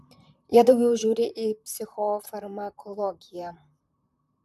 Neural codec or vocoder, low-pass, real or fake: vocoder, 44.1 kHz, 128 mel bands, Pupu-Vocoder; 14.4 kHz; fake